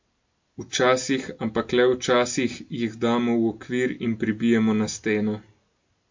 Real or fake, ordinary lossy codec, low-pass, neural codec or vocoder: real; MP3, 48 kbps; 7.2 kHz; none